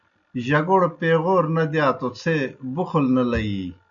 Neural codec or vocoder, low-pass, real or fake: none; 7.2 kHz; real